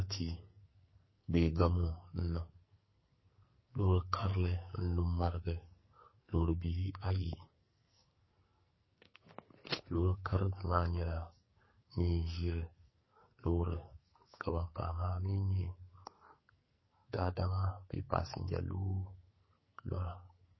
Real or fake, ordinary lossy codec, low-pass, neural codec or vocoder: fake; MP3, 24 kbps; 7.2 kHz; codec, 44.1 kHz, 2.6 kbps, SNAC